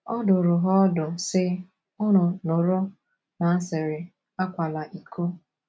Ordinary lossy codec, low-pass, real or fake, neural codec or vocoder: none; none; real; none